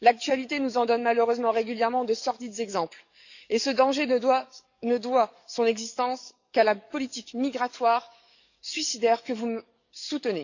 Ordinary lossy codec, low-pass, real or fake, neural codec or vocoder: none; 7.2 kHz; fake; codec, 44.1 kHz, 7.8 kbps, DAC